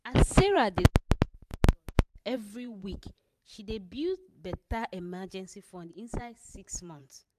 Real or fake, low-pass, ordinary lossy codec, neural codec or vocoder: fake; 14.4 kHz; AAC, 96 kbps; vocoder, 44.1 kHz, 128 mel bands every 512 samples, BigVGAN v2